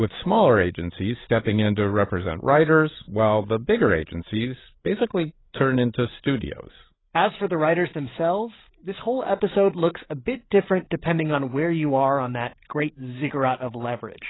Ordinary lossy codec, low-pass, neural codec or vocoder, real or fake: AAC, 16 kbps; 7.2 kHz; codec, 16 kHz, 8 kbps, FreqCodec, larger model; fake